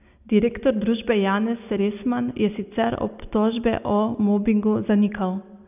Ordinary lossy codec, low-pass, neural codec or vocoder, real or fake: none; 3.6 kHz; none; real